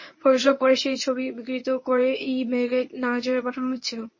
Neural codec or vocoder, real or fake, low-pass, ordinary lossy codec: codec, 24 kHz, 0.9 kbps, WavTokenizer, medium speech release version 1; fake; 7.2 kHz; MP3, 32 kbps